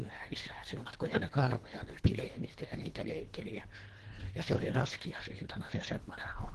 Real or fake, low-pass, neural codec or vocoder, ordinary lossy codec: fake; 10.8 kHz; codec, 24 kHz, 1.5 kbps, HILCodec; Opus, 16 kbps